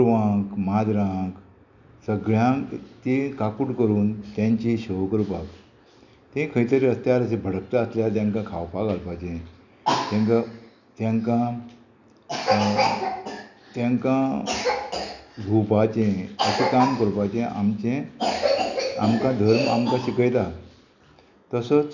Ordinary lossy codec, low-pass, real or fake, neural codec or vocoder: none; 7.2 kHz; real; none